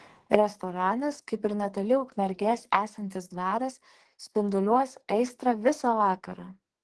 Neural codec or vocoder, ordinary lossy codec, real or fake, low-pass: codec, 44.1 kHz, 2.6 kbps, SNAC; Opus, 16 kbps; fake; 10.8 kHz